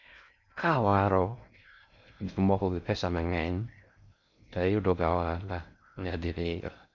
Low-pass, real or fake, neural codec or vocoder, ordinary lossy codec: 7.2 kHz; fake; codec, 16 kHz in and 24 kHz out, 0.6 kbps, FocalCodec, streaming, 4096 codes; none